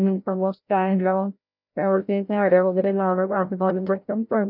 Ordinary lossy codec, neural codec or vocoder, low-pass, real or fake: none; codec, 16 kHz, 0.5 kbps, FreqCodec, larger model; 5.4 kHz; fake